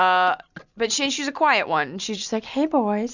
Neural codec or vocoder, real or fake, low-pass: none; real; 7.2 kHz